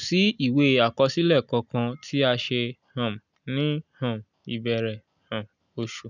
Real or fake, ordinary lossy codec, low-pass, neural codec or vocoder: real; none; 7.2 kHz; none